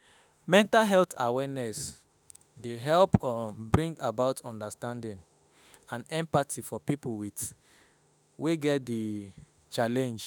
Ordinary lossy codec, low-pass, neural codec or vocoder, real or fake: none; none; autoencoder, 48 kHz, 32 numbers a frame, DAC-VAE, trained on Japanese speech; fake